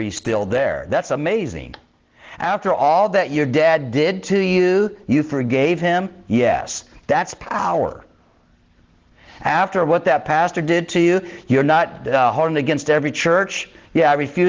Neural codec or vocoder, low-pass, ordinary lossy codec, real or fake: none; 7.2 kHz; Opus, 16 kbps; real